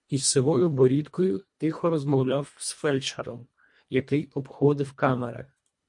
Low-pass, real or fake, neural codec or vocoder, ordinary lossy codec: 10.8 kHz; fake; codec, 24 kHz, 1.5 kbps, HILCodec; MP3, 48 kbps